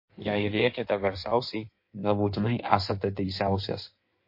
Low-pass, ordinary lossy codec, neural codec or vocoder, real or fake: 5.4 kHz; MP3, 32 kbps; codec, 16 kHz in and 24 kHz out, 1.1 kbps, FireRedTTS-2 codec; fake